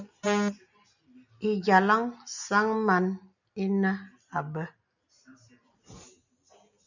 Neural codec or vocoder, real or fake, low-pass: none; real; 7.2 kHz